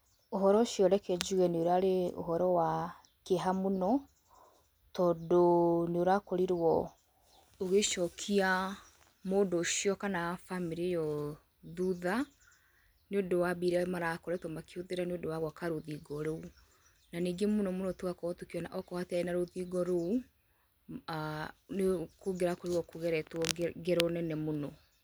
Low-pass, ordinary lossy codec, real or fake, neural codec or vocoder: none; none; real; none